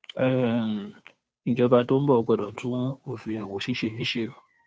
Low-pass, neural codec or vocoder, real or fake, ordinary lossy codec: none; codec, 16 kHz, 2 kbps, FunCodec, trained on Chinese and English, 25 frames a second; fake; none